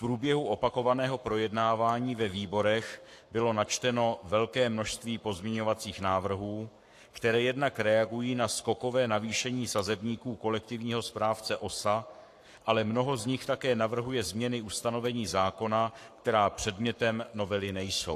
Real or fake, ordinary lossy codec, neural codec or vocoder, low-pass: fake; AAC, 64 kbps; codec, 44.1 kHz, 7.8 kbps, Pupu-Codec; 14.4 kHz